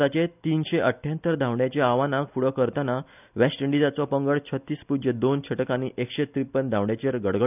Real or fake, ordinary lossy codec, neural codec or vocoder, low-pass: real; none; none; 3.6 kHz